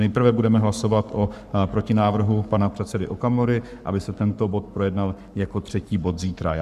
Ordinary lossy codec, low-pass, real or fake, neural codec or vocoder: Opus, 64 kbps; 14.4 kHz; fake; codec, 44.1 kHz, 7.8 kbps, Pupu-Codec